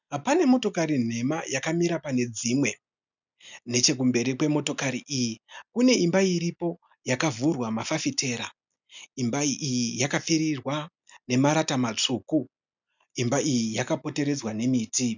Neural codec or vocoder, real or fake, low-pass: none; real; 7.2 kHz